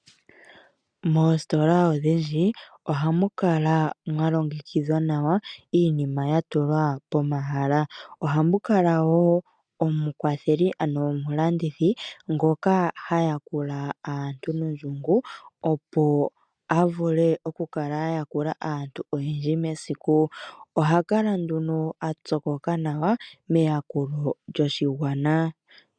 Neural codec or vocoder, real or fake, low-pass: none; real; 9.9 kHz